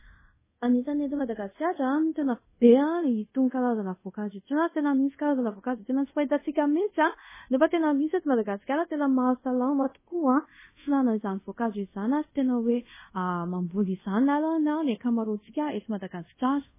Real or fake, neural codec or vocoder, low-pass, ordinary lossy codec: fake; codec, 24 kHz, 0.5 kbps, DualCodec; 3.6 kHz; MP3, 16 kbps